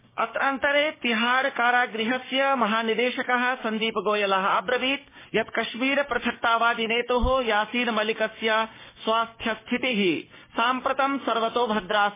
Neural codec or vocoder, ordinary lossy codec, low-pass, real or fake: codec, 16 kHz, 6 kbps, DAC; MP3, 16 kbps; 3.6 kHz; fake